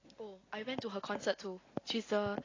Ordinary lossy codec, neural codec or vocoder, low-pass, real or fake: AAC, 32 kbps; none; 7.2 kHz; real